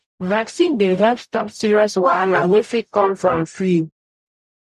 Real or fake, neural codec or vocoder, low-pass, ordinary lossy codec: fake; codec, 44.1 kHz, 0.9 kbps, DAC; 14.4 kHz; none